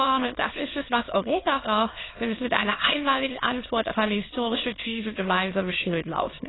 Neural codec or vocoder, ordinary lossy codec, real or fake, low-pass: autoencoder, 22.05 kHz, a latent of 192 numbers a frame, VITS, trained on many speakers; AAC, 16 kbps; fake; 7.2 kHz